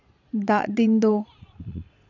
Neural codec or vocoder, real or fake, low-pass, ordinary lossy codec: vocoder, 22.05 kHz, 80 mel bands, Vocos; fake; 7.2 kHz; none